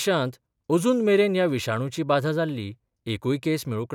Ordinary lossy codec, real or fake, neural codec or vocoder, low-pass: none; real; none; 19.8 kHz